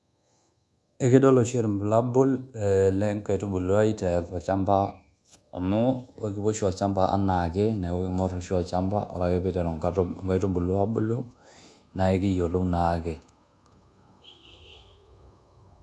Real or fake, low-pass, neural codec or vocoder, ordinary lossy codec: fake; none; codec, 24 kHz, 1.2 kbps, DualCodec; none